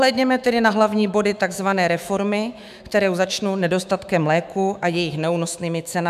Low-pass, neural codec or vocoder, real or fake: 14.4 kHz; autoencoder, 48 kHz, 128 numbers a frame, DAC-VAE, trained on Japanese speech; fake